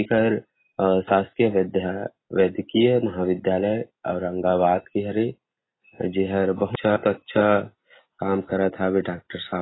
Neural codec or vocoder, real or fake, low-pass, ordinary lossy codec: none; real; 7.2 kHz; AAC, 16 kbps